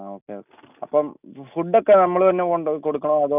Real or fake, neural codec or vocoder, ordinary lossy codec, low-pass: real; none; none; 3.6 kHz